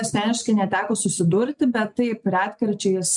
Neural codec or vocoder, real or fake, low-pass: none; real; 10.8 kHz